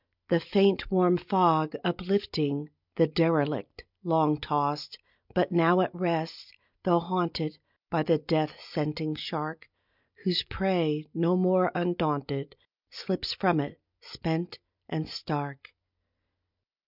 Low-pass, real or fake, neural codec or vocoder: 5.4 kHz; real; none